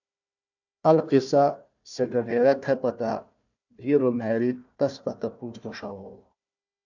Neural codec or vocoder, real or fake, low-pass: codec, 16 kHz, 1 kbps, FunCodec, trained on Chinese and English, 50 frames a second; fake; 7.2 kHz